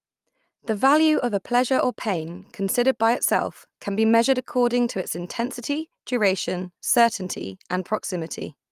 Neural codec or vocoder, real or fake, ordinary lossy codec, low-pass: none; real; Opus, 32 kbps; 14.4 kHz